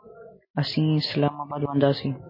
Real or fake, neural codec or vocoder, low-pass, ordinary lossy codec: real; none; 5.4 kHz; MP3, 24 kbps